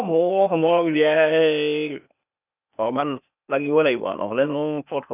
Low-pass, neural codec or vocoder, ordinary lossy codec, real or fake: 3.6 kHz; codec, 16 kHz, 0.8 kbps, ZipCodec; none; fake